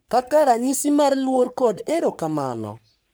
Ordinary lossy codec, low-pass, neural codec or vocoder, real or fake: none; none; codec, 44.1 kHz, 3.4 kbps, Pupu-Codec; fake